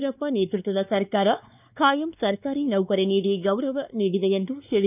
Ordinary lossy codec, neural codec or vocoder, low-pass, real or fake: none; codec, 16 kHz, 2 kbps, X-Codec, WavLM features, trained on Multilingual LibriSpeech; 3.6 kHz; fake